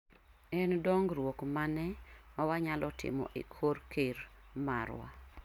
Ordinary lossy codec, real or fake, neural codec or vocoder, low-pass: none; real; none; 19.8 kHz